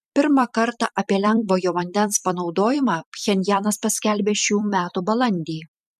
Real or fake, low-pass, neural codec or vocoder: fake; 14.4 kHz; vocoder, 44.1 kHz, 128 mel bands every 256 samples, BigVGAN v2